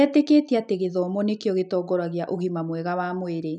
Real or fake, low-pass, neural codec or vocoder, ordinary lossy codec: real; 9.9 kHz; none; none